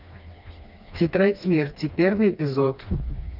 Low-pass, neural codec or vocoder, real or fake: 5.4 kHz; codec, 16 kHz, 2 kbps, FreqCodec, smaller model; fake